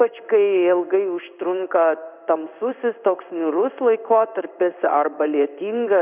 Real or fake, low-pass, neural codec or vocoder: fake; 3.6 kHz; codec, 16 kHz in and 24 kHz out, 1 kbps, XY-Tokenizer